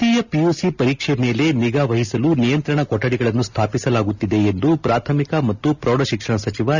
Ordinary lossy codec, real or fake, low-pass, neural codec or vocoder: none; real; 7.2 kHz; none